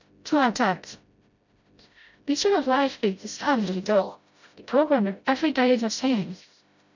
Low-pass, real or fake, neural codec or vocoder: 7.2 kHz; fake; codec, 16 kHz, 0.5 kbps, FreqCodec, smaller model